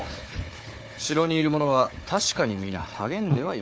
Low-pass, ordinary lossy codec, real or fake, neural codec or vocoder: none; none; fake; codec, 16 kHz, 4 kbps, FunCodec, trained on Chinese and English, 50 frames a second